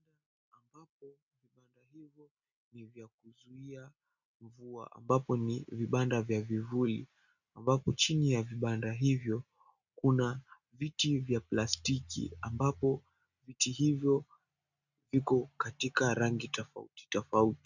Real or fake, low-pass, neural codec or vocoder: real; 7.2 kHz; none